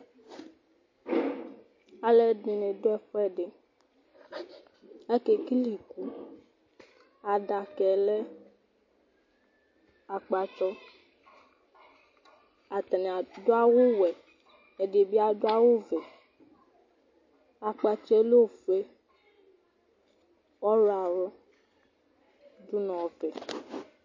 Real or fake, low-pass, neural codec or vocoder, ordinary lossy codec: real; 7.2 kHz; none; MP3, 32 kbps